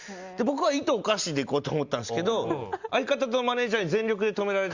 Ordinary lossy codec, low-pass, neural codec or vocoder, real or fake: Opus, 64 kbps; 7.2 kHz; none; real